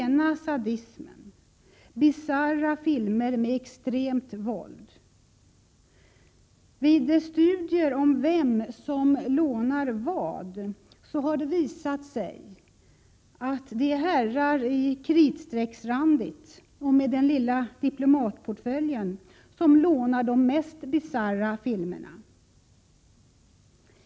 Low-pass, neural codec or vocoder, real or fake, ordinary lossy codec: none; none; real; none